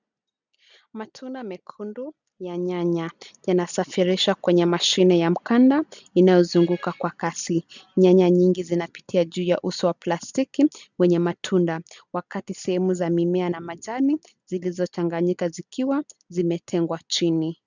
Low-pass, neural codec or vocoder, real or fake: 7.2 kHz; none; real